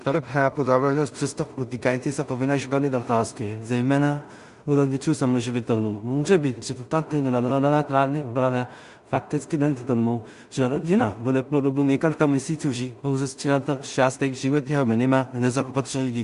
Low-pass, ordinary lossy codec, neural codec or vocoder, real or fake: 10.8 kHz; Opus, 64 kbps; codec, 16 kHz in and 24 kHz out, 0.4 kbps, LongCat-Audio-Codec, two codebook decoder; fake